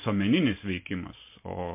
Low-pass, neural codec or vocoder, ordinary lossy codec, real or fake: 3.6 kHz; none; MP3, 24 kbps; real